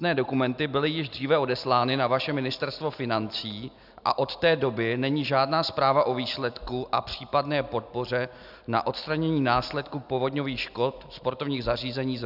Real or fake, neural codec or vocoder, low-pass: real; none; 5.4 kHz